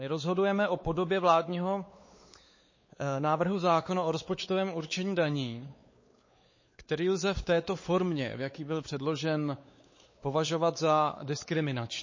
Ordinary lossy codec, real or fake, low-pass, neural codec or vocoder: MP3, 32 kbps; fake; 7.2 kHz; codec, 16 kHz, 4 kbps, X-Codec, WavLM features, trained on Multilingual LibriSpeech